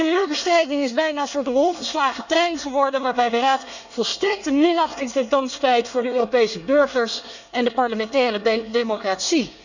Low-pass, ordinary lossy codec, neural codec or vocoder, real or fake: 7.2 kHz; none; codec, 24 kHz, 1 kbps, SNAC; fake